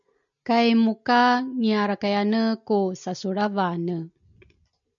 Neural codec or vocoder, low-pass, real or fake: none; 7.2 kHz; real